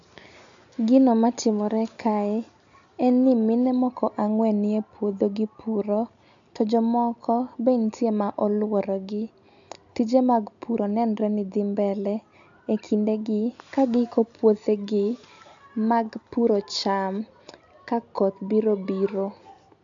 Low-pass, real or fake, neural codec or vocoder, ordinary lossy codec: 7.2 kHz; real; none; AAC, 64 kbps